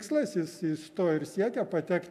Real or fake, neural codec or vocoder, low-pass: real; none; 14.4 kHz